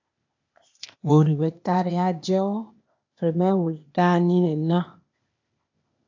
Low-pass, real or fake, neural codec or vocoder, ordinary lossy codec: 7.2 kHz; fake; codec, 16 kHz, 0.8 kbps, ZipCodec; AAC, 48 kbps